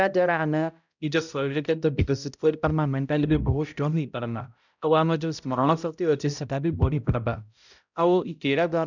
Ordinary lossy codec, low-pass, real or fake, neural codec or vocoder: none; 7.2 kHz; fake; codec, 16 kHz, 0.5 kbps, X-Codec, HuBERT features, trained on balanced general audio